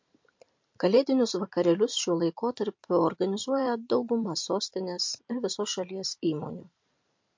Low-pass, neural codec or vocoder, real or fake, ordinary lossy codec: 7.2 kHz; vocoder, 44.1 kHz, 128 mel bands every 512 samples, BigVGAN v2; fake; MP3, 48 kbps